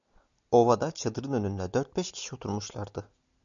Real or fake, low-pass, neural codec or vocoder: real; 7.2 kHz; none